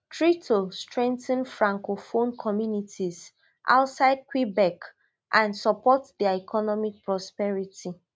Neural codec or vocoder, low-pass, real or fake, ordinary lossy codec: none; none; real; none